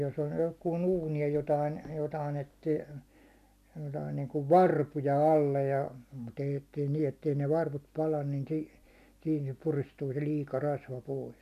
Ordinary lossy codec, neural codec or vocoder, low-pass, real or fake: none; none; 14.4 kHz; real